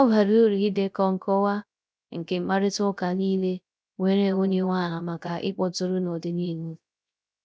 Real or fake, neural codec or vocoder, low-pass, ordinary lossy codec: fake; codec, 16 kHz, 0.3 kbps, FocalCodec; none; none